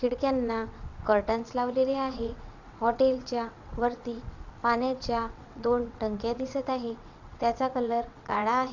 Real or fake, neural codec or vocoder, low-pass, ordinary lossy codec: fake; vocoder, 22.05 kHz, 80 mel bands, WaveNeXt; 7.2 kHz; none